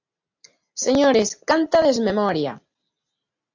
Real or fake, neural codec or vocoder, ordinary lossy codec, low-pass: real; none; AAC, 48 kbps; 7.2 kHz